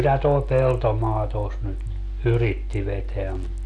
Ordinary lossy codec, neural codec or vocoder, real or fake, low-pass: none; none; real; none